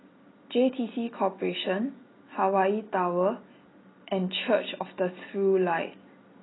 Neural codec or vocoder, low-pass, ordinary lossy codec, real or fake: none; 7.2 kHz; AAC, 16 kbps; real